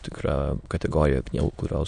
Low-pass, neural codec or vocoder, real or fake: 9.9 kHz; autoencoder, 22.05 kHz, a latent of 192 numbers a frame, VITS, trained on many speakers; fake